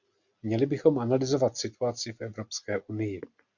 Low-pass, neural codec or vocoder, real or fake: 7.2 kHz; none; real